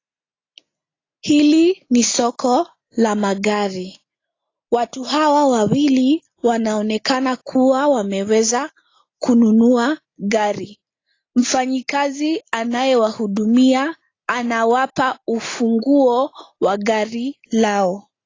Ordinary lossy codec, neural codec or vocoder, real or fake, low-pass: AAC, 32 kbps; none; real; 7.2 kHz